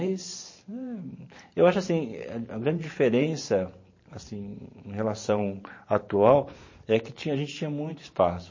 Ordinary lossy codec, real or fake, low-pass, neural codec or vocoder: MP3, 32 kbps; fake; 7.2 kHz; vocoder, 44.1 kHz, 128 mel bands every 512 samples, BigVGAN v2